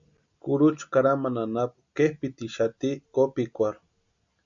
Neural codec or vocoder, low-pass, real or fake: none; 7.2 kHz; real